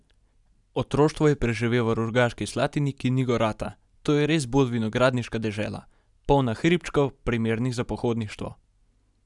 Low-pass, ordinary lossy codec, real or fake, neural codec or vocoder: 10.8 kHz; none; real; none